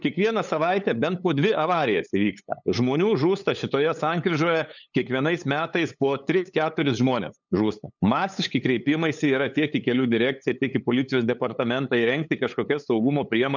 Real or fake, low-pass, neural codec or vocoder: fake; 7.2 kHz; codec, 16 kHz, 8 kbps, FunCodec, trained on LibriTTS, 25 frames a second